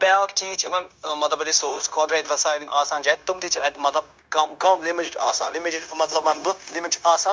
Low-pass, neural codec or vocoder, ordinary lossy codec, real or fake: none; codec, 16 kHz, 0.9 kbps, LongCat-Audio-Codec; none; fake